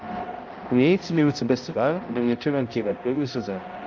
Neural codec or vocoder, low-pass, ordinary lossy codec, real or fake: codec, 16 kHz, 0.5 kbps, X-Codec, HuBERT features, trained on balanced general audio; 7.2 kHz; Opus, 24 kbps; fake